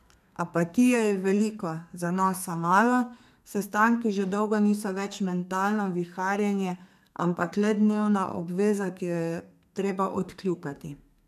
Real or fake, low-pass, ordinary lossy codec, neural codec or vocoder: fake; 14.4 kHz; none; codec, 32 kHz, 1.9 kbps, SNAC